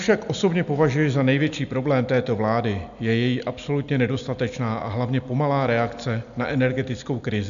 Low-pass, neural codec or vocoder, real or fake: 7.2 kHz; none; real